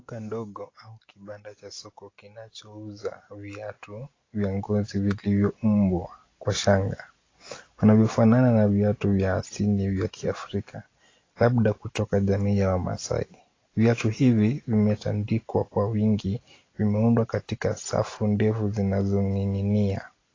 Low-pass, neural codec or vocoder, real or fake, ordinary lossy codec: 7.2 kHz; none; real; AAC, 32 kbps